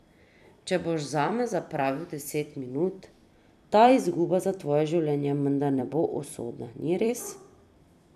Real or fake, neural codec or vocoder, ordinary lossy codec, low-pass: fake; vocoder, 44.1 kHz, 128 mel bands every 256 samples, BigVGAN v2; none; 14.4 kHz